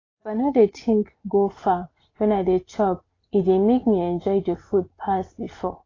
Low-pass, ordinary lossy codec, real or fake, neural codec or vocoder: 7.2 kHz; AAC, 32 kbps; real; none